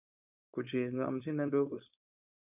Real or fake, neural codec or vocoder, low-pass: fake; codec, 16 kHz, 4.8 kbps, FACodec; 3.6 kHz